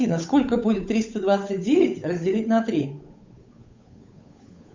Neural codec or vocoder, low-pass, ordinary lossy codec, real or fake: codec, 16 kHz, 8 kbps, FunCodec, trained on Chinese and English, 25 frames a second; 7.2 kHz; MP3, 64 kbps; fake